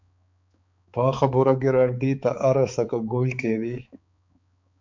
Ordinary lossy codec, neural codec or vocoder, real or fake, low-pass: MP3, 64 kbps; codec, 16 kHz, 4 kbps, X-Codec, HuBERT features, trained on balanced general audio; fake; 7.2 kHz